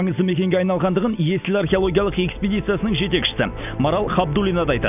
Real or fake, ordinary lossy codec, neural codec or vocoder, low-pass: real; none; none; 3.6 kHz